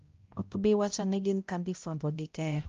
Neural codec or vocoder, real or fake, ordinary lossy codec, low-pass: codec, 16 kHz, 0.5 kbps, X-Codec, HuBERT features, trained on balanced general audio; fake; Opus, 32 kbps; 7.2 kHz